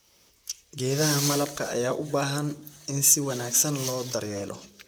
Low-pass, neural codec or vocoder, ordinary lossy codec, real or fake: none; vocoder, 44.1 kHz, 128 mel bands, Pupu-Vocoder; none; fake